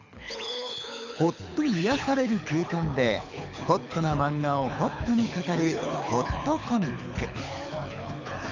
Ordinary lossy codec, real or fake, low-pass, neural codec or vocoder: none; fake; 7.2 kHz; codec, 24 kHz, 6 kbps, HILCodec